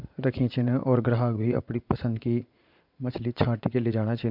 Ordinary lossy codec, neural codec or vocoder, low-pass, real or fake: MP3, 48 kbps; vocoder, 44.1 kHz, 80 mel bands, Vocos; 5.4 kHz; fake